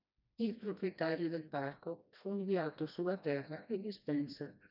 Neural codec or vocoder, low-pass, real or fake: codec, 16 kHz, 1 kbps, FreqCodec, smaller model; 5.4 kHz; fake